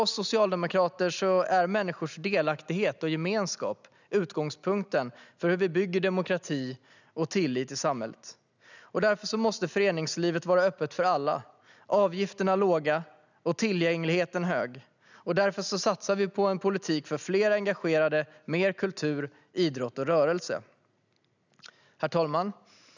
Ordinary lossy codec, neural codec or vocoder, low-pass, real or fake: none; none; 7.2 kHz; real